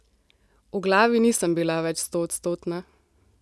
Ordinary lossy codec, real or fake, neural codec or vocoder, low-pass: none; real; none; none